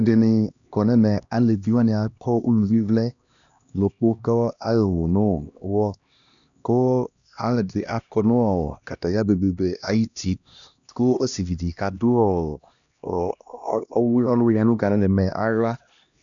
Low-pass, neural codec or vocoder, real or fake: 7.2 kHz; codec, 16 kHz, 1 kbps, X-Codec, HuBERT features, trained on LibriSpeech; fake